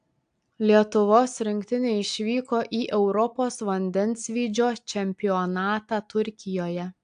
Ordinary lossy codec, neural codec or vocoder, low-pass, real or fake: AAC, 64 kbps; none; 9.9 kHz; real